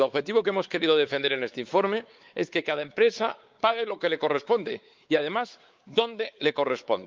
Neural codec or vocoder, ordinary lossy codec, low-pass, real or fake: codec, 16 kHz, 4 kbps, X-Codec, WavLM features, trained on Multilingual LibriSpeech; Opus, 32 kbps; 7.2 kHz; fake